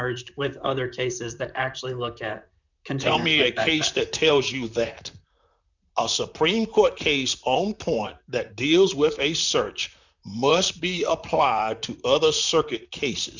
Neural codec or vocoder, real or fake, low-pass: vocoder, 44.1 kHz, 128 mel bands, Pupu-Vocoder; fake; 7.2 kHz